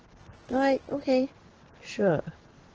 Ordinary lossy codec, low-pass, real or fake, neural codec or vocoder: Opus, 16 kbps; 7.2 kHz; fake; codec, 16 kHz in and 24 kHz out, 1 kbps, XY-Tokenizer